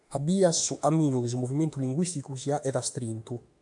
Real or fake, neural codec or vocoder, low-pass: fake; autoencoder, 48 kHz, 32 numbers a frame, DAC-VAE, trained on Japanese speech; 10.8 kHz